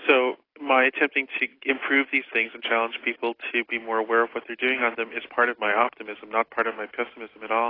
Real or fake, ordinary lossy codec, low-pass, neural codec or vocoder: real; AAC, 24 kbps; 5.4 kHz; none